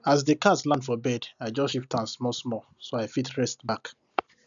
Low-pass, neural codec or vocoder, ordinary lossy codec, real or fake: 7.2 kHz; none; none; real